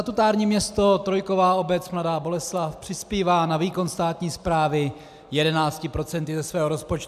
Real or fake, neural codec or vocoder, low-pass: real; none; 14.4 kHz